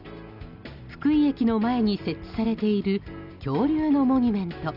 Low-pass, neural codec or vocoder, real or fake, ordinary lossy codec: 5.4 kHz; none; real; none